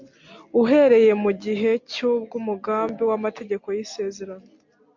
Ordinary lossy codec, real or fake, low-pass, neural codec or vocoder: MP3, 48 kbps; real; 7.2 kHz; none